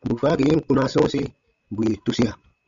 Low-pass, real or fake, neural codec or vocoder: 7.2 kHz; fake; codec, 16 kHz, 16 kbps, FreqCodec, larger model